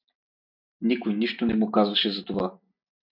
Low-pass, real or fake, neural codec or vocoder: 5.4 kHz; real; none